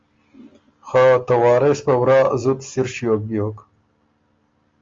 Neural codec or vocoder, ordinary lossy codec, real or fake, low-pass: none; Opus, 32 kbps; real; 7.2 kHz